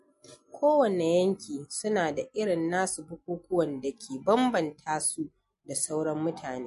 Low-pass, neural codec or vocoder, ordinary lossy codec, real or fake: 14.4 kHz; none; MP3, 48 kbps; real